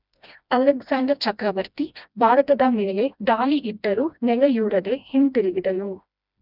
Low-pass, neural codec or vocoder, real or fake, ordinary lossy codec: 5.4 kHz; codec, 16 kHz, 1 kbps, FreqCodec, smaller model; fake; none